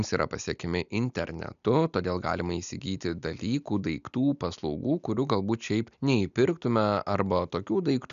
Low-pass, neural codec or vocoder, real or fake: 7.2 kHz; none; real